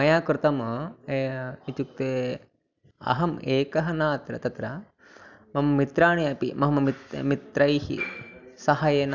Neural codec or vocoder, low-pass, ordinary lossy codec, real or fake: none; 7.2 kHz; none; real